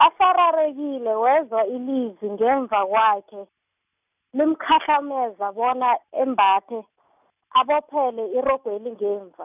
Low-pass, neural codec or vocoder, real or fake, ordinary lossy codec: 3.6 kHz; none; real; none